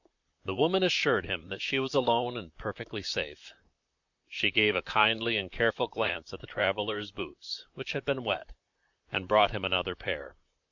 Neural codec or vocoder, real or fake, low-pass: vocoder, 44.1 kHz, 128 mel bands, Pupu-Vocoder; fake; 7.2 kHz